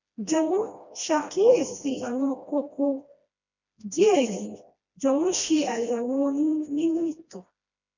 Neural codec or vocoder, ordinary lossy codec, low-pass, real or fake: codec, 16 kHz, 1 kbps, FreqCodec, smaller model; none; 7.2 kHz; fake